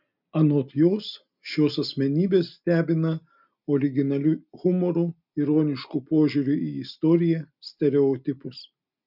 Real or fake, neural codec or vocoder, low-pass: real; none; 5.4 kHz